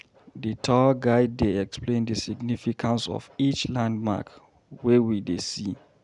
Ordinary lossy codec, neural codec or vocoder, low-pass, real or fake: none; none; 10.8 kHz; real